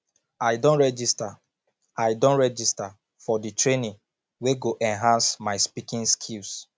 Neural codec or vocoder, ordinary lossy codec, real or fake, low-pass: none; none; real; none